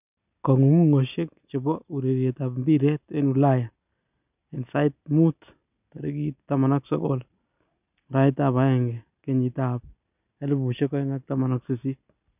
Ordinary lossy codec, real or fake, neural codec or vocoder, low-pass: none; real; none; 3.6 kHz